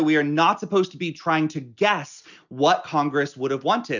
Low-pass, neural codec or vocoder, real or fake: 7.2 kHz; none; real